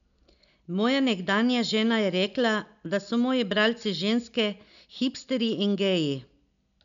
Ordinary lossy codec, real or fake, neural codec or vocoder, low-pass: none; real; none; 7.2 kHz